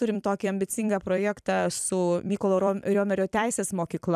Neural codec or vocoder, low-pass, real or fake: vocoder, 44.1 kHz, 128 mel bands every 256 samples, BigVGAN v2; 14.4 kHz; fake